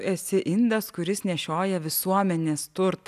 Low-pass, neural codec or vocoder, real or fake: 14.4 kHz; none; real